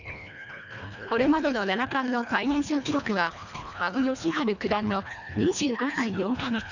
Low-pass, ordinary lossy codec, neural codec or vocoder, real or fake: 7.2 kHz; none; codec, 24 kHz, 1.5 kbps, HILCodec; fake